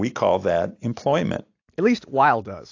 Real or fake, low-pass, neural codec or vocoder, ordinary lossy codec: real; 7.2 kHz; none; AAC, 48 kbps